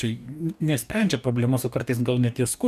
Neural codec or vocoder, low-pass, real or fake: codec, 44.1 kHz, 2.6 kbps, DAC; 14.4 kHz; fake